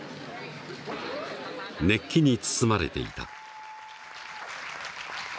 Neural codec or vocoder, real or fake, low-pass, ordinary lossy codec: none; real; none; none